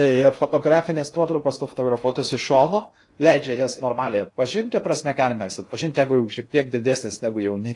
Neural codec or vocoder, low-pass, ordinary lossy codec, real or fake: codec, 16 kHz in and 24 kHz out, 0.6 kbps, FocalCodec, streaming, 4096 codes; 10.8 kHz; AAC, 48 kbps; fake